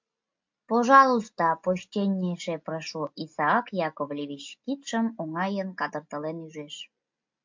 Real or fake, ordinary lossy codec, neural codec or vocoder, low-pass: real; MP3, 48 kbps; none; 7.2 kHz